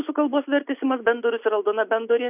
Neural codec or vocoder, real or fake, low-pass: none; real; 3.6 kHz